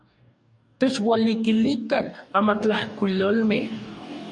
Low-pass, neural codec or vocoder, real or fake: 10.8 kHz; codec, 44.1 kHz, 2.6 kbps, DAC; fake